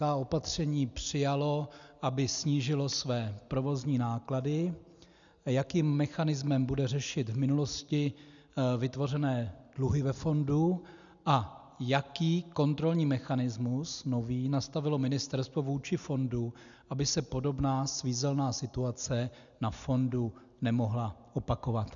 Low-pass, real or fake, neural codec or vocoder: 7.2 kHz; real; none